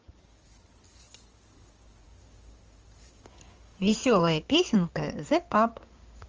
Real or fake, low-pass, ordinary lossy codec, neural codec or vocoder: fake; 7.2 kHz; Opus, 24 kbps; codec, 16 kHz in and 24 kHz out, 2.2 kbps, FireRedTTS-2 codec